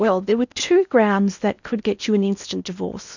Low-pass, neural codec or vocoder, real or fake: 7.2 kHz; codec, 16 kHz in and 24 kHz out, 0.8 kbps, FocalCodec, streaming, 65536 codes; fake